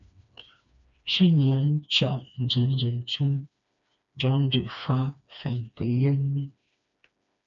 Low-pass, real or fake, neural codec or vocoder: 7.2 kHz; fake; codec, 16 kHz, 2 kbps, FreqCodec, smaller model